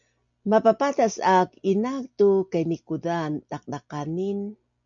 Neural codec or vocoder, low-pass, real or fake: none; 7.2 kHz; real